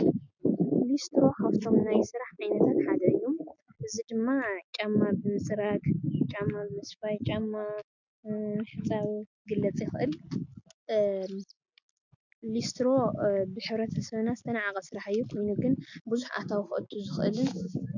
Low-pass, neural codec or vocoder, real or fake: 7.2 kHz; none; real